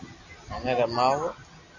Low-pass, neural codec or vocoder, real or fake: 7.2 kHz; none; real